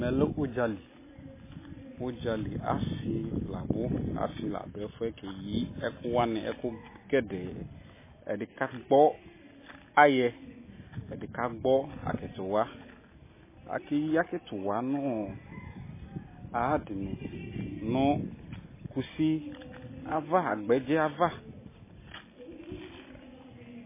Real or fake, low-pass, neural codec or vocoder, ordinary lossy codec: real; 3.6 kHz; none; MP3, 16 kbps